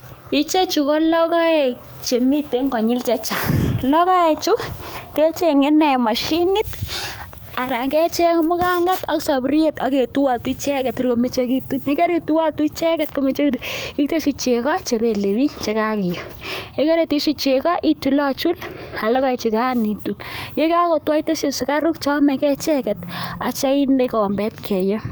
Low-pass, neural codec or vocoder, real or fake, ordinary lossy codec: none; codec, 44.1 kHz, 7.8 kbps, DAC; fake; none